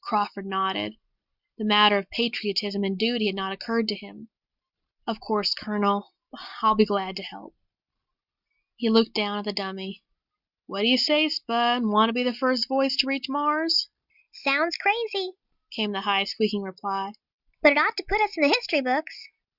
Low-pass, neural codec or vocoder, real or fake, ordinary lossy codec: 5.4 kHz; none; real; Opus, 64 kbps